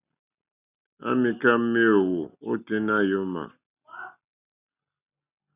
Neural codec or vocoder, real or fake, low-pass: none; real; 3.6 kHz